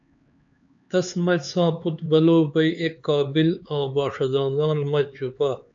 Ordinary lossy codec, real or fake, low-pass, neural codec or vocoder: AAC, 64 kbps; fake; 7.2 kHz; codec, 16 kHz, 4 kbps, X-Codec, HuBERT features, trained on LibriSpeech